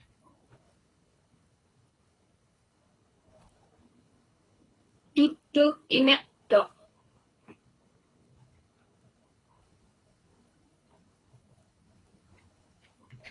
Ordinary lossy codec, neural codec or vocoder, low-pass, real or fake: AAC, 32 kbps; codec, 24 kHz, 3 kbps, HILCodec; 10.8 kHz; fake